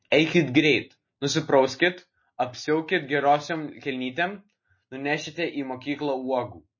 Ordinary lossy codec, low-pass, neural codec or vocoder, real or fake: MP3, 32 kbps; 7.2 kHz; none; real